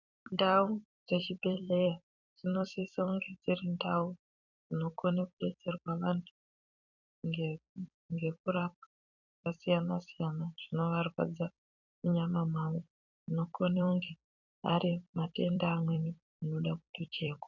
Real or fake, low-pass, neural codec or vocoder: fake; 7.2 kHz; vocoder, 44.1 kHz, 128 mel bands every 256 samples, BigVGAN v2